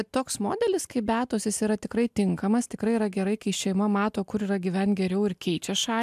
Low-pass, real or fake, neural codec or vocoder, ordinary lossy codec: 14.4 kHz; real; none; AAC, 96 kbps